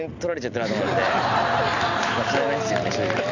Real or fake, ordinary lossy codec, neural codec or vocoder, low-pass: real; none; none; 7.2 kHz